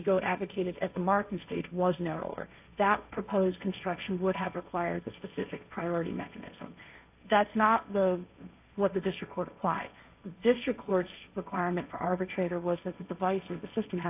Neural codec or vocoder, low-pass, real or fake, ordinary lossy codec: codec, 16 kHz, 1.1 kbps, Voila-Tokenizer; 3.6 kHz; fake; AAC, 32 kbps